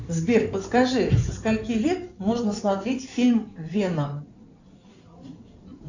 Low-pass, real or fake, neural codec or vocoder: 7.2 kHz; fake; codec, 16 kHz in and 24 kHz out, 2.2 kbps, FireRedTTS-2 codec